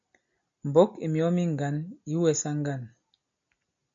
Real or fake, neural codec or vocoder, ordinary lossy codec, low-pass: real; none; MP3, 48 kbps; 7.2 kHz